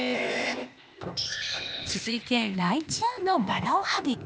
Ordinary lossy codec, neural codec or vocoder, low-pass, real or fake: none; codec, 16 kHz, 0.8 kbps, ZipCodec; none; fake